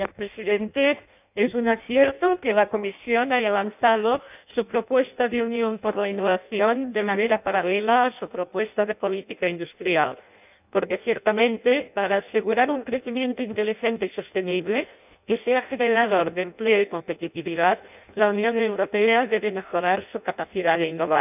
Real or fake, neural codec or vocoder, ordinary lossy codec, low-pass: fake; codec, 16 kHz in and 24 kHz out, 0.6 kbps, FireRedTTS-2 codec; none; 3.6 kHz